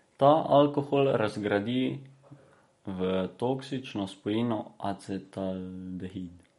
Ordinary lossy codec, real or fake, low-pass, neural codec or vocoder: MP3, 48 kbps; real; 19.8 kHz; none